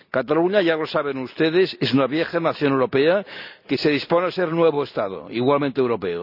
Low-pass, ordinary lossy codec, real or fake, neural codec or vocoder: 5.4 kHz; none; real; none